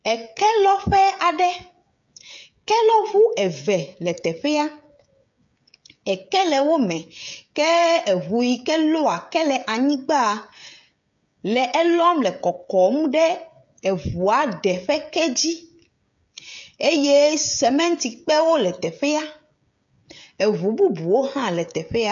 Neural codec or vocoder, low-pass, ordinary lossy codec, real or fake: codec, 16 kHz, 16 kbps, FreqCodec, smaller model; 7.2 kHz; MP3, 64 kbps; fake